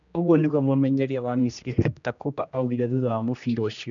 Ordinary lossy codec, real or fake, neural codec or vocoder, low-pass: none; fake; codec, 16 kHz, 1 kbps, X-Codec, HuBERT features, trained on general audio; 7.2 kHz